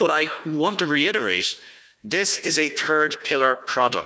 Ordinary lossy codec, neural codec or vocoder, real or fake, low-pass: none; codec, 16 kHz, 1 kbps, FunCodec, trained on Chinese and English, 50 frames a second; fake; none